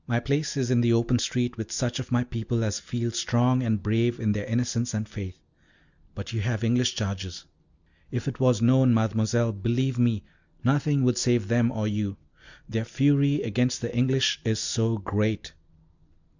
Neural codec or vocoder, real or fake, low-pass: none; real; 7.2 kHz